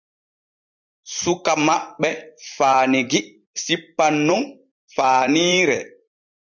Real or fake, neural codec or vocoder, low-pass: fake; vocoder, 44.1 kHz, 128 mel bands every 256 samples, BigVGAN v2; 7.2 kHz